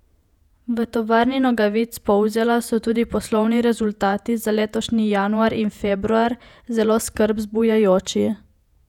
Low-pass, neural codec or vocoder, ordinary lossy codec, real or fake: 19.8 kHz; vocoder, 48 kHz, 128 mel bands, Vocos; none; fake